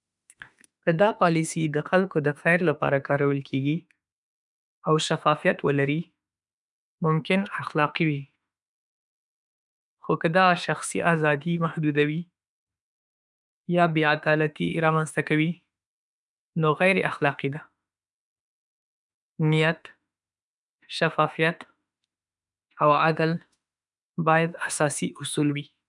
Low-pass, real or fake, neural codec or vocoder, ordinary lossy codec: 10.8 kHz; fake; autoencoder, 48 kHz, 32 numbers a frame, DAC-VAE, trained on Japanese speech; none